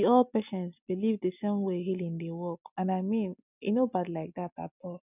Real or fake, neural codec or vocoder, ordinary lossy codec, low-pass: real; none; none; 3.6 kHz